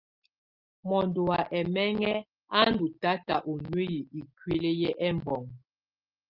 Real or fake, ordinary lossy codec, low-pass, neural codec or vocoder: real; Opus, 32 kbps; 5.4 kHz; none